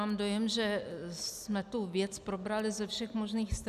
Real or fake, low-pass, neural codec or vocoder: real; 14.4 kHz; none